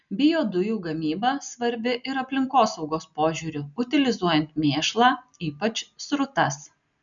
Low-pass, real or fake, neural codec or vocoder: 7.2 kHz; real; none